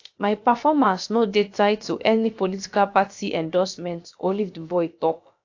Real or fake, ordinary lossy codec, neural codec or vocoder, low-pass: fake; AAC, 48 kbps; codec, 16 kHz, about 1 kbps, DyCAST, with the encoder's durations; 7.2 kHz